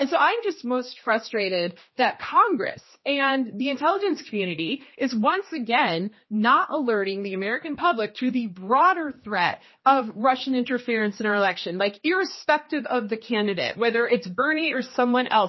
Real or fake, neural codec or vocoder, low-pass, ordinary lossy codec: fake; codec, 16 kHz, 2 kbps, X-Codec, HuBERT features, trained on general audio; 7.2 kHz; MP3, 24 kbps